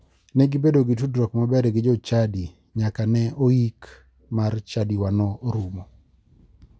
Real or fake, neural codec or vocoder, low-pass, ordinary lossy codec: real; none; none; none